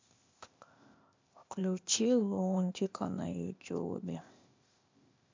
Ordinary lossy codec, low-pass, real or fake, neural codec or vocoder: MP3, 64 kbps; 7.2 kHz; fake; codec, 16 kHz, 0.8 kbps, ZipCodec